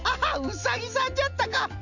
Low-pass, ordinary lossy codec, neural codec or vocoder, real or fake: 7.2 kHz; none; none; real